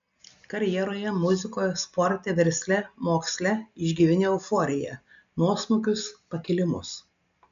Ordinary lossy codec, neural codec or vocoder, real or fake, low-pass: AAC, 96 kbps; none; real; 7.2 kHz